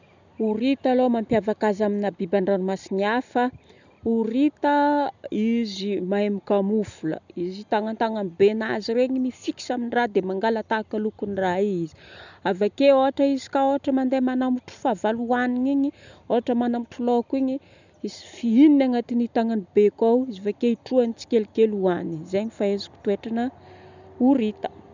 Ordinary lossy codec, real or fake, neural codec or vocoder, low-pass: none; real; none; 7.2 kHz